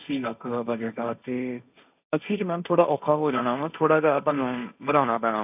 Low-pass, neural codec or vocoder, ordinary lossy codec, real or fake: 3.6 kHz; codec, 16 kHz, 1.1 kbps, Voila-Tokenizer; none; fake